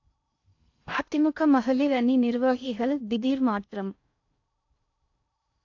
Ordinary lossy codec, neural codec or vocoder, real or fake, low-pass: AAC, 48 kbps; codec, 16 kHz in and 24 kHz out, 0.6 kbps, FocalCodec, streaming, 2048 codes; fake; 7.2 kHz